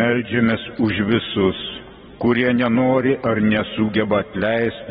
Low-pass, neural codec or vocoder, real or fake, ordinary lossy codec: 19.8 kHz; vocoder, 44.1 kHz, 128 mel bands every 512 samples, BigVGAN v2; fake; AAC, 16 kbps